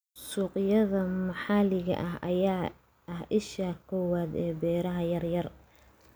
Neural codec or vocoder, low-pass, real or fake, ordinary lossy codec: none; none; real; none